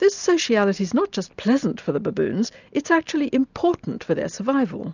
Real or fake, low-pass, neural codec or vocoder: real; 7.2 kHz; none